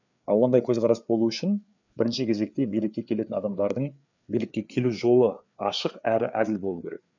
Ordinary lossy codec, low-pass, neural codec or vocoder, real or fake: none; 7.2 kHz; codec, 16 kHz, 4 kbps, FreqCodec, larger model; fake